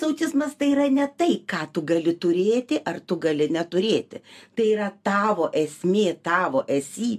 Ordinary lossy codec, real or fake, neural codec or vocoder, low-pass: AAC, 96 kbps; real; none; 14.4 kHz